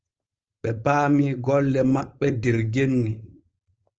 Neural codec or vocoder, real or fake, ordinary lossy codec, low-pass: codec, 16 kHz, 4.8 kbps, FACodec; fake; Opus, 24 kbps; 7.2 kHz